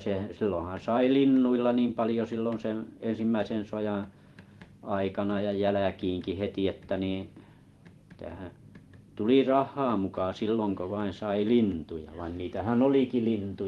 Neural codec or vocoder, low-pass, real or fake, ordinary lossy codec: vocoder, 44.1 kHz, 128 mel bands every 512 samples, BigVGAN v2; 19.8 kHz; fake; Opus, 24 kbps